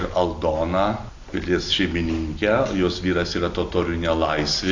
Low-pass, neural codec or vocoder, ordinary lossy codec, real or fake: 7.2 kHz; none; AAC, 48 kbps; real